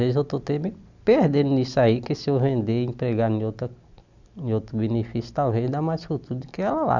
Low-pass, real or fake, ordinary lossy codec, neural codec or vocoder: 7.2 kHz; real; none; none